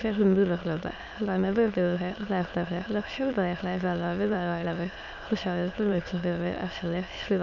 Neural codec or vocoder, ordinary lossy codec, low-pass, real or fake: autoencoder, 22.05 kHz, a latent of 192 numbers a frame, VITS, trained on many speakers; none; 7.2 kHz; fake